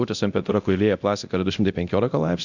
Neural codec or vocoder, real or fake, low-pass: codec, 24 kHz, 0.9 kbps, DualCodec; fake; 7.2 kHz